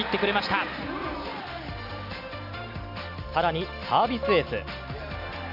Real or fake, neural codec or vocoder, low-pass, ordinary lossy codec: real; none; 5.4 kHz; none